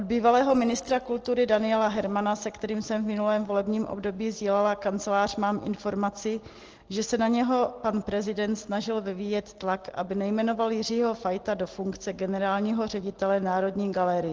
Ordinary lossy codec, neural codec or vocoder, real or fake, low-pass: Opus, 16 kbps; none; real; 7.2 kHz